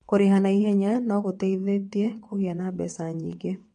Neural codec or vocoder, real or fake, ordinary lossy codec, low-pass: vocoder, 22.05 kHz, 80 mel bands, WaveNeXt; fake; MP3, 48 kbps; 9.9 kHz